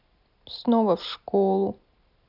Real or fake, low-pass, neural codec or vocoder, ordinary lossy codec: real; 5.4 kHz; none; none